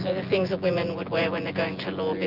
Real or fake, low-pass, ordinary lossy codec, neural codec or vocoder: fake; 5.4 kHz; Opus, 24 kbps; vocoder, 24 kHz, 100 mel bands, Vocos